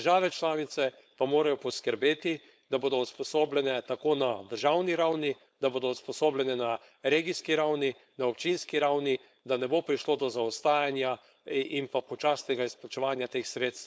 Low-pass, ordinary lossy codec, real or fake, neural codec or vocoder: none; none; fake; codec, 16 kHz, 4.8 kbps, FACodec